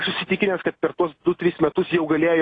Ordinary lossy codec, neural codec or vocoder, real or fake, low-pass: AAC, 32 kbps; none; real; 9.9 kHz